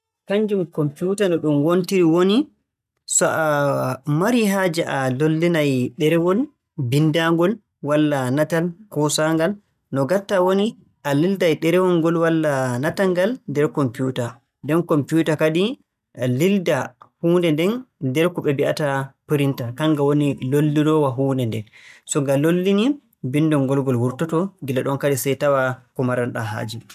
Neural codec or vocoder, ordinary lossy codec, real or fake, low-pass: none; none; real; 14.4 kHz